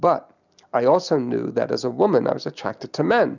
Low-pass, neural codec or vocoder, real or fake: 7.2 kHz; none; real